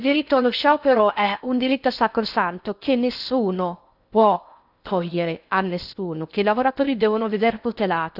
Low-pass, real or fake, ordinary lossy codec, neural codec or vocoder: 5.4 kHz; fake; none; codec, 16 kHz in and 24 kHz out, 0.6 kbps, FocalCodec, streaming, 4096 codes